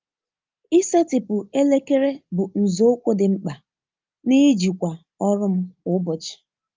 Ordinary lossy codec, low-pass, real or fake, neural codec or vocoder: Opus, 24 kbps; 7.2 kHz; real; none